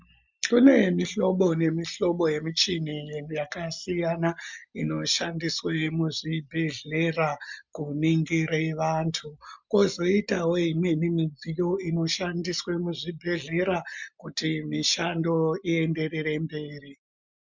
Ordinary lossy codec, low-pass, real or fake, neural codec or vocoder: MP3, 64 kbps; 7.2 kHz; real; none